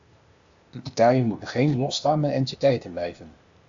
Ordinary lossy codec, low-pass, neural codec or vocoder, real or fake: AAC, 64 kbps; 7.2 kHz; codec, 16 kHz, 0.8 kbps, ZipCodec; fake